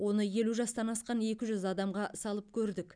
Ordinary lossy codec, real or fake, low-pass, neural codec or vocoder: none; real; 9.9 kHz; none